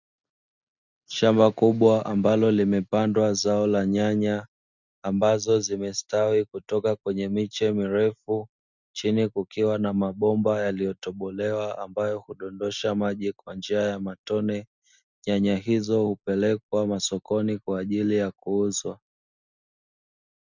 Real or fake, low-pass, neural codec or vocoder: real; 7.2 kHz; none